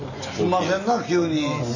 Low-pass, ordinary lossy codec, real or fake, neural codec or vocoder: 7.2 kHz; none; real; none